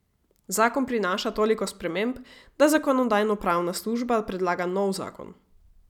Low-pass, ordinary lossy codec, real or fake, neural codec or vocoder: 19.8 kHz; none; real; none